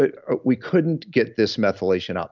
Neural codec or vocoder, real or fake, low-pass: none; real; 7.2 kHz